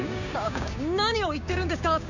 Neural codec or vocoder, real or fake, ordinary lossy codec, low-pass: codec, 16 kHz, 6 kbps, DAC; fake; none; 7.2 kHz